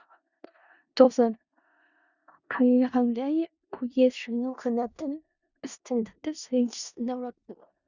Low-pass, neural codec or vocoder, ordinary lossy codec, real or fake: 7.2 kHz; codec, 16 kHz in and 24 kHz out, 0.4 kbps, LongCat-Audio-Codec, four codebook decoder; Opus, 64 kbps; fake